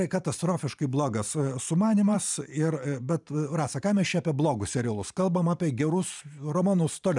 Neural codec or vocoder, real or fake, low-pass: vocoder, 44.1 kHz, 128 mel bands every 512 samples, BigVGAN v2; fake; 10.8 kHz